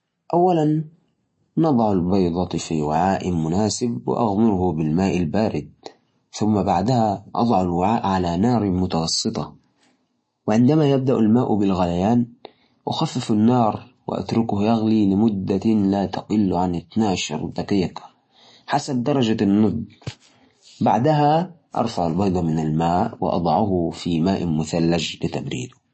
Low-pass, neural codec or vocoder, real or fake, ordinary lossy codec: 9.9 kHz; none; real; MP3, 32 kbps